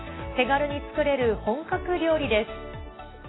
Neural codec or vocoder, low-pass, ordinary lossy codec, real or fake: none; 7.2 kHz; AAC, 16 kbps; real